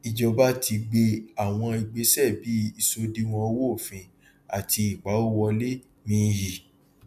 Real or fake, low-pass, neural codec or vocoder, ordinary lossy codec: real; 14.4 kHz; none; none